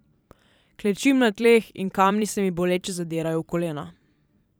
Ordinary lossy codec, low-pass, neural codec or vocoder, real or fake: none; none; vocoder, 44.1 kHz, 128 mel bands every 512 samples, BigVGAN v2; fake